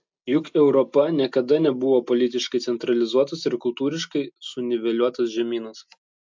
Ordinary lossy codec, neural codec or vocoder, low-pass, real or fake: MP3, 64 kbps; none; 7.2 kHz; real